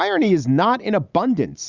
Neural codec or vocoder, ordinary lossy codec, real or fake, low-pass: none; Opus, 64 kbps; real; 7.2 kHz